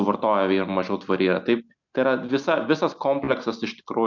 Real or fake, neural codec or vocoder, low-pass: real; none; 7.2 kHz